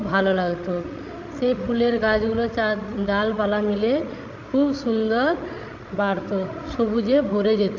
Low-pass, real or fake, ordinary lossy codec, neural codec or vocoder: 7.2 kHz; fake; MP3, 48 kbps; codec, 16 kHz, 16 kbps, FreqCodec, larger model